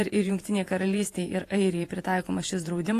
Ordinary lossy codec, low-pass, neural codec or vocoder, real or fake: AAC, 48 kbps; 14.4 kHz; vocoder, 48 kHz, 128 mel bands, Vocos; fake